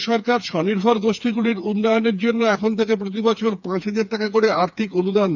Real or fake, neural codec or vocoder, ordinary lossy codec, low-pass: fake; codec, 16 kHz, 4 kbps, FreqCodec, smaller model; none; 7.2 kHz